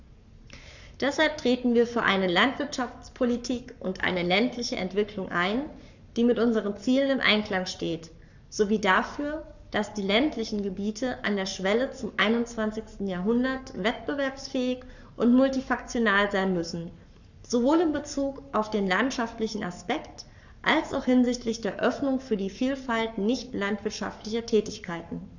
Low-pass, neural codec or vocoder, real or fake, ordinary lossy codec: 7.2 kHz; codec, 44.1 kHz, 7.8 kbps, Pupu-Codec; fake; none